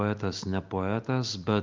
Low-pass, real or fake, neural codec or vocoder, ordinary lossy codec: 7.2 kHz; real; none; Opus, 32 kbps